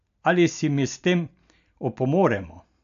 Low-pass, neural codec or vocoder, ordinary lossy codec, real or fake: 7.2 kHz; none; AAC, 96 kbps; real